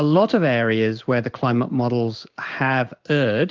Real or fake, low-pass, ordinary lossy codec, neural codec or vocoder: fake; 7.2 kHz; Opus, 32 kbps; codec, 16 kHz in and 24 kHz out, 1 kbps, XY-Tokenizer